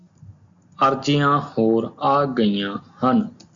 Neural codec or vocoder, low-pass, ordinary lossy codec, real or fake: none; 7.2 kHz; AAC, 64 kbps; real